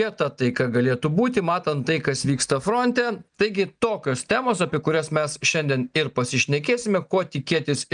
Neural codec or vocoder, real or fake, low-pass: none; real; 9.9 kHz